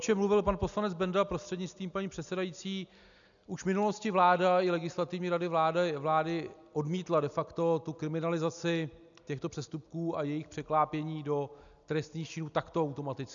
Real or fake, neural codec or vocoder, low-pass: real; none; 7.2 kHz